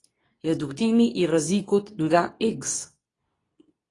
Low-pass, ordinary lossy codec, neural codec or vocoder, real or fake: 10.8 kHz; AAC, 32 kbps; codec, 24 kHz, 0.9 kbps, WavTokenizer, medium speech release version 2; fake